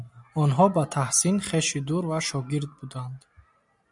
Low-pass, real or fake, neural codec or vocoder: 10.8 kHz; real; none